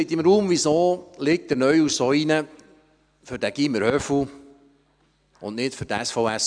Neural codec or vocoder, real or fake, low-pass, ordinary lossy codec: none; real; 9.9 kHz; none